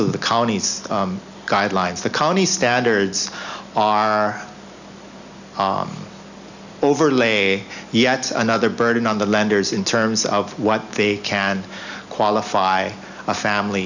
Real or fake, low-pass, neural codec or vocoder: real; 7.2 kHz; none